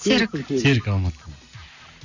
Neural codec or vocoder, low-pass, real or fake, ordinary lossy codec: none; 7.2 kHz; real; none